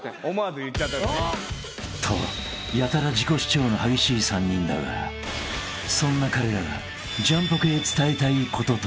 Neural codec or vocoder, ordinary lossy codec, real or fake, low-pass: none; none; real; none